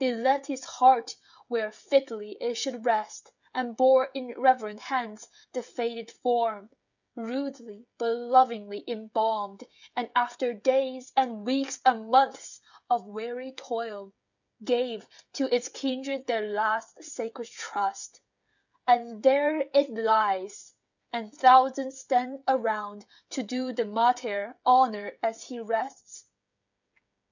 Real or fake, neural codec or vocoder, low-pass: fake; codec, 16 kHz, 16 kbps, FreqCodec, smaller model; 7.2 kHz